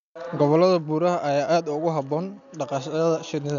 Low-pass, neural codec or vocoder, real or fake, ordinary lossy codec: 7.2 kHz; none; real; MP3, 96 kbps